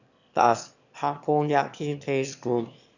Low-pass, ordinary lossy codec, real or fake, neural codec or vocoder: 7.2 kHz; none; fake; autoencoder, 22.05 kHz, a latent of 192 numbers a frame, VITS, trained on one speaker